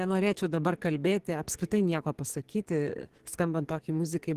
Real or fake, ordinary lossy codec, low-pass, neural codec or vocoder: fake; Opus, 16 kbps; 14.4 kHz; codec, 44.1 kHz, 2.6 kbps, SNAC